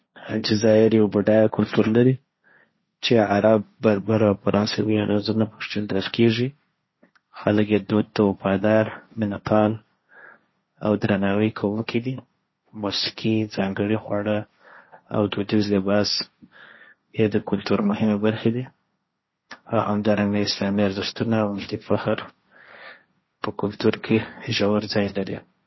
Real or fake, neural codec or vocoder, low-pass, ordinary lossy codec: fake; codec, 16 kHz, 1.1 kbps, Voila-Tokenizer; 7.2 kHz; MP3, 24 kbps